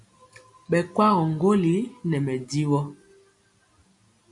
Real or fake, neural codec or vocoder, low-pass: real; none; 10.8 kHz